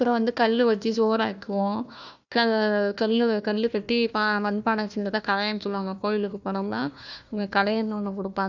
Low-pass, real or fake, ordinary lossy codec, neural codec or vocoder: 7.2 kHz; fake; none; codec, 16 kHz, 1 kbps, FunCodec, trained on Chinese and English, 50 frames a second